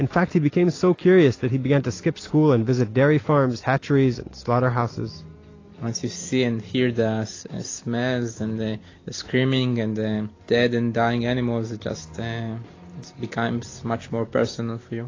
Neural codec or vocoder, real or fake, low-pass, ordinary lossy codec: none; real; 7.2 kHz; AAC, 32 kbps